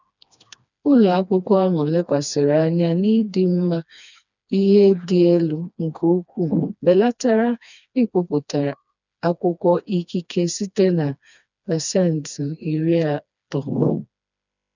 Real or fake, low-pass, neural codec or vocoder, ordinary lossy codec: fake; 7.2 kHz; codec, 16 kHz, 2 kbps, FreqCodec, smaller model; none